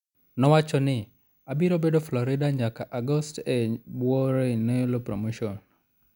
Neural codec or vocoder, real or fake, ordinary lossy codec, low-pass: none; real; none; 19.8 kHz